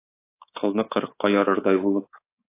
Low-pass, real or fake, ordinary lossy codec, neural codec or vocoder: 3.6 kHz; fake; AAC, 24 kbps; codec, 24 kHz, 3.1 kbps, DualCodec